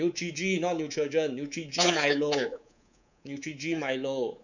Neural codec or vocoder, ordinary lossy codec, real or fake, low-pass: codec, 24 kHz, 3.1 kbps, DualCodec; none; fake; 7.2 kHz